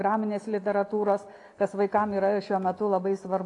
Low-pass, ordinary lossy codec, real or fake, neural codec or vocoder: 10.8 kHz; AAC, 48 kbps; real; none